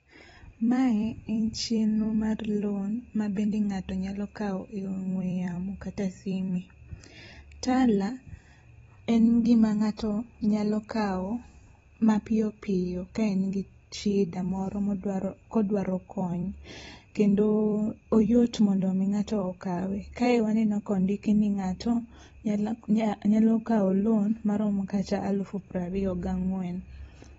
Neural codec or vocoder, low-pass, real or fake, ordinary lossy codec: vocoder, 44.1 kHz, 128 mel bands every 256 samples, BigVGAN v2; 19.8 kHz; fake; AAC, 24 kbps